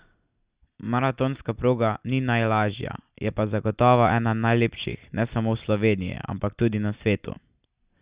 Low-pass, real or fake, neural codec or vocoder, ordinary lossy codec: 3.6 kHz; real; none; Opus, 64 kbps